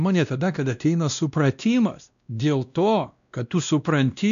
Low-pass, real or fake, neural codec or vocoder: 7.2 kHz; fake; codec, 16 kHz, 1 kbps, X-Codec, WavLM features, trained on Multilingual LibriSpeech